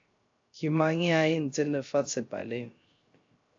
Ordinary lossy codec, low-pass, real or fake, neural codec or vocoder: AAC, 48 kbps; 7.2 kHz; fake; codec, 16 kHz, 0.3 kbps, FocalCodec